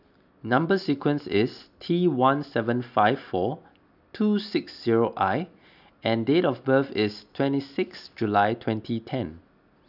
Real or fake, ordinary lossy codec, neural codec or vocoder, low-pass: real; none; none; 5.4 kHz